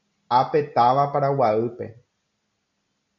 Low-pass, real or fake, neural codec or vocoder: 7.2 kHz; real; none